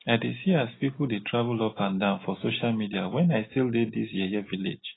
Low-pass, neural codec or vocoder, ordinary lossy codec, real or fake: 7.2 kHz; none; AAC, 16 kbps; real